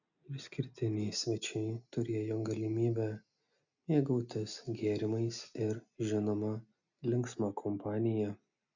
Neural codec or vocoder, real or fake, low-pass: none; real; 7.2 kHz